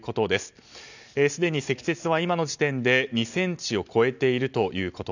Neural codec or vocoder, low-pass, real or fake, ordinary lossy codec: none; 7.2 kHz; real; none